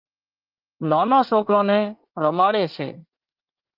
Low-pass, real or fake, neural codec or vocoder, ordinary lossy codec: 5.4 kHz; fake; codec, 24 kHz, 1 kbps, SNAC; Opus, 32 kbps